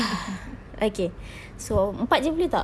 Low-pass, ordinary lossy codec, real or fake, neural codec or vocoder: none; none; real; none